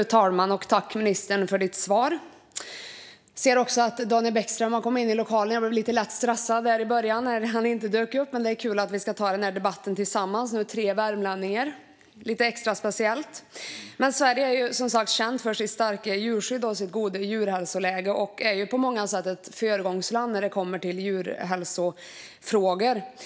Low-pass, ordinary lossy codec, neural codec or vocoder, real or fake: none; none; none; real